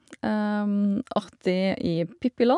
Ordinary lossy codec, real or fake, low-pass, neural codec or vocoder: none; real; 10.8 kHz; none